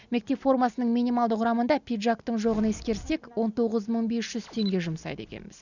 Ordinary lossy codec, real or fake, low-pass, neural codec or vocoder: none; real; 7.2 kHz; none